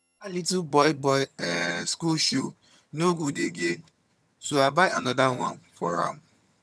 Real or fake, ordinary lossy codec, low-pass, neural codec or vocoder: fake; none; none; vocoder, 22.05 kHz, 80 mel bands, HiFi-GAN